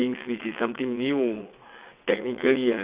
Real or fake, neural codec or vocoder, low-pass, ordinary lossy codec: fake; vocoder, 22.05 kHz, 80 mel bands, WaveNeXt; 3.6 kHz; Opus, 64 kbps